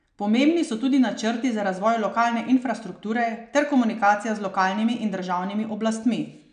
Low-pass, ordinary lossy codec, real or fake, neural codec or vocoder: 9.9 kHz; none; real; none